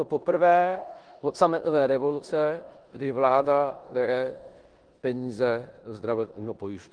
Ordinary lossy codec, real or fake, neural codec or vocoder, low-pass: Opus, 24 kbps; fake; codec, 16 kHz in and 24 kHz out, 0.9 kbps, LongCat-Audio-Codec, four codebook decoder; 9.9 kHz